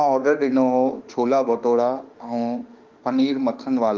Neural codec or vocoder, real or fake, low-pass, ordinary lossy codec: autoencoder, 48 kHz, 32 numbers a frame, DAC-VAE, trained on Japanese speech; fake; 7.2 kHz; Opus, 32 kbps